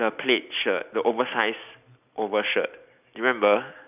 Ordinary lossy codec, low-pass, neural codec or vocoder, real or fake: none; 3.6 kHz; none; real